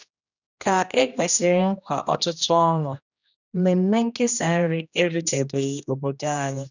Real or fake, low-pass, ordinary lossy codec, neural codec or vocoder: fake; 7.2 kHz; none; codec, 16 kHz, 1 kbps, X-Codec, HuBERT features, trained on general audio